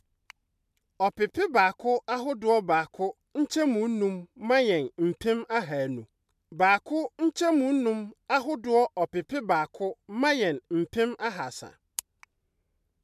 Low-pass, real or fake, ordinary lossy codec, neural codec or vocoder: 14.4 kHz; real; MP3, 96 kbps; none